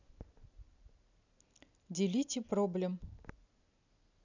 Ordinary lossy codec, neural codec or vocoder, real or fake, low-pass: none; none; real; 7.2 kHz